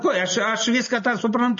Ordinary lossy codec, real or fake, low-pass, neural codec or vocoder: MP3, 32 kbps; real; 7.2 kHz; none